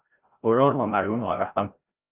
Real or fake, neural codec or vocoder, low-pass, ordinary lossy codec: fake; codec, 16 kHz, 0.5 kbps, FreqCodec, larger model; 3.6 kHz; Opus, 32 kbps